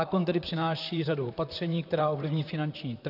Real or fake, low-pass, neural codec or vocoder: fake; 5.4 kHz; vocoder, 44.1 kHz, 128 mel bands, Pupu-Vocoder